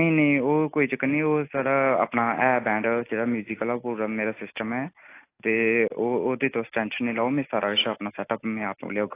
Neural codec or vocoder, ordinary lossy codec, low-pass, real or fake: none; AAC, 24 kbps; 3.6 kHz; real